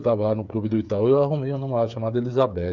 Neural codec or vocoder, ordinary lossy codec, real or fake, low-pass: codec, 16 kHz, 8 kbps, FreqCodec, smaller model; none; fake; 7.2 kHz